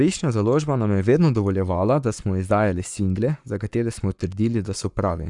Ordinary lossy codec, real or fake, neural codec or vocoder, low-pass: none; fake; codec, 44.1 kHz, 7.8 kbps, Pupu-Codec; 10.8 kHz